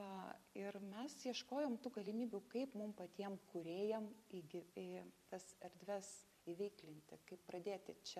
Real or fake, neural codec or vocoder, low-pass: fake; vocoder, 44.1 kHz, 128 mel bands every 256 samples, BigVGAN v2; 14.4 kHz